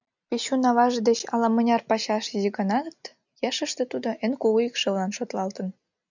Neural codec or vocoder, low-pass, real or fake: none; 7.2 kHz; real